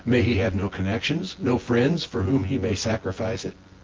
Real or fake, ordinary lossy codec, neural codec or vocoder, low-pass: fake; Opus, 16 kbps; vocoder, 24 kHz, 100 mel bands, Vocos; 7.2 kHz